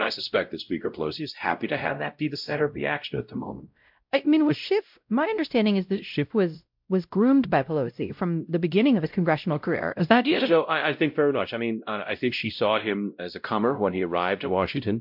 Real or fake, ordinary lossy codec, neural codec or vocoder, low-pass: fake; MP3, 48 kbps; codec, 16 kHz, 0.5 kbps, X-Codec, WavLM features, trained on Multilingual LibriSpeech; 5.4 kHz